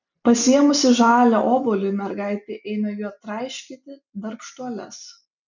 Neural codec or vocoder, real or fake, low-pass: none; real; 7.2 kHz